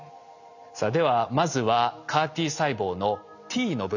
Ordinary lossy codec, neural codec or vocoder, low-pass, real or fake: none; none; 7.2 kHz; real